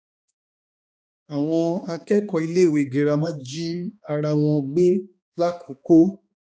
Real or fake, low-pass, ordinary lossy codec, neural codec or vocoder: fake; none; none; codec, 16 kHz, 2 kbps, X-Codec, HuBERT features, trained on balanced general audio